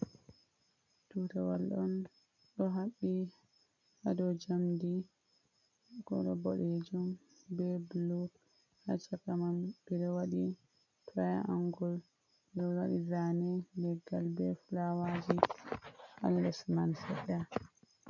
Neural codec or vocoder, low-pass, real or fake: none; 7.2 kHz; real